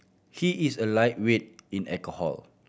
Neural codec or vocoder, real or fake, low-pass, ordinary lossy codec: none; real; none; none